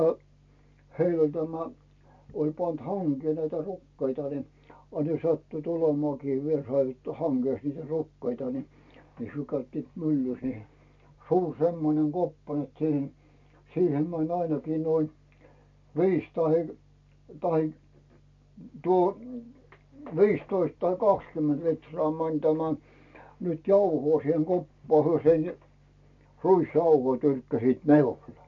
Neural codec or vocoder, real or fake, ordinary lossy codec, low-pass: none; real; MP3, 48 kbps; 7.2 kHz